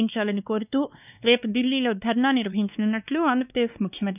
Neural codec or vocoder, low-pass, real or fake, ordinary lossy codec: codec, 16 kHz, 2 kbps, X-Codec, WavLM features, trained on Multilingual LibriSpeech; 3.6 kHz; fake; none